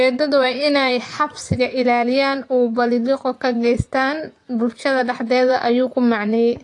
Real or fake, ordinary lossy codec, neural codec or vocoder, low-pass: fake; AAC, 48 kbps; vocoder, 24 kHz, 100 mel bands, Vocos; 10.8 kHz